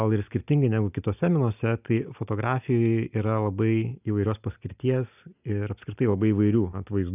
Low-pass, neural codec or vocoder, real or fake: 3.6 kHz; none; real